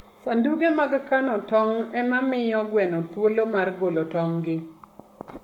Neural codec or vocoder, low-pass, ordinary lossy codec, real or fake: codec, 44.1 kHz, 7.8 kbps, Pupu-Codec; 19.8 kHz; MP3, 96 kbps; fake